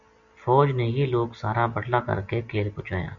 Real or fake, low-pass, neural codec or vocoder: real; 7.2 kHz; none